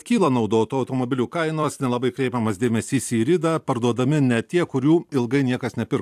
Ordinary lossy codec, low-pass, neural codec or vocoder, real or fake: AAC, 96 kbps; 14.4 kHz; vocoder, 44.1 kHz, 128 mel bands every 256 samples, BigVGAN v2; fake